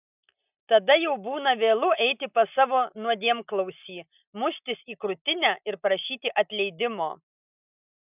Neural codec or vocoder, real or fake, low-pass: none; real; 3.6 kHz